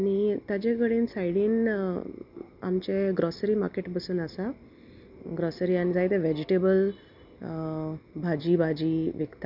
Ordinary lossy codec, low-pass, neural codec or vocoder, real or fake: none; 5.4 kHz; none; real